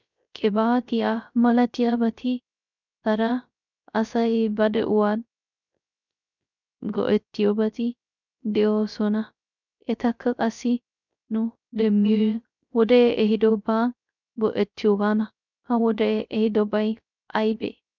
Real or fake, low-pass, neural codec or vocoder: fake; 7.2 kHz; codec, 16 kHz, 0.3 kbps, FocalCodec